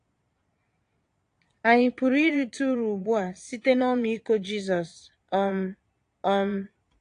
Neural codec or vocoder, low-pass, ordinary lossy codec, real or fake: vocoder, 22.05 kHz, 80 mel bands, Vocos; 9.9 kHz; AAC, 48 kbps; fake